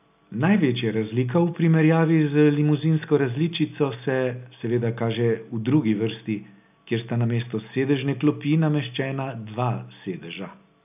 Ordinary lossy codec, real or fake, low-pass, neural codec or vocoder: none; real; 3.6 kHz; none